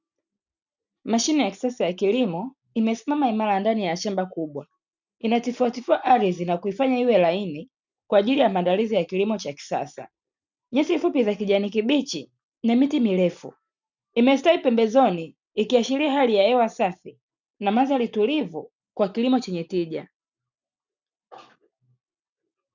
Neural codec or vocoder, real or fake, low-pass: none; real; 7.2 kHz